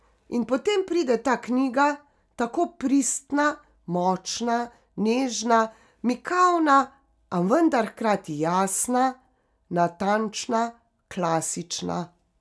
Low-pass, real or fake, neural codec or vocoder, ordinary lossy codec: none; real; none; none